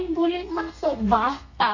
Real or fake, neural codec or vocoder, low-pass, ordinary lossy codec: fake; codec, 32 kHz, 1.9 kbps, SNAC; 7.2 kHz; none